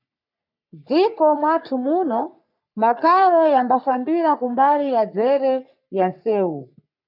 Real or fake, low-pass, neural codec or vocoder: fake; 5.4 kHz; codec, 44.1 kHz, 3.4 kbps, Pupu-Codec